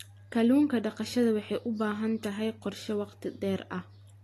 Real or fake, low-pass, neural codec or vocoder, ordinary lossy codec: real; 14.4 kHz; none; AAC, 48 kbps